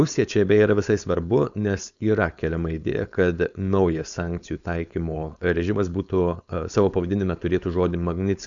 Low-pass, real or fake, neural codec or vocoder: 7.2 kHz; fake; codec, 16 kHz, 4.8 kbps, FACodec